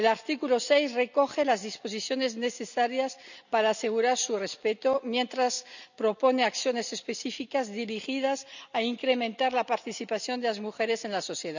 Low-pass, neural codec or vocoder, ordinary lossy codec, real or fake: 7.2 kHz; none; none; real